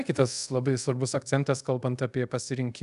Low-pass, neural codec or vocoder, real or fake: 10.8 kHz; codec, 24 kHz, 0.5 kbps, DualCodec; fake